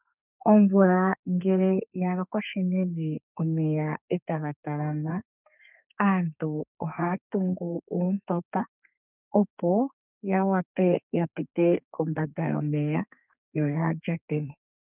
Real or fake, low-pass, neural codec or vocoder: fake; 3.6 kHz; codec, 32 kHz, 1.9 kbps, SNAC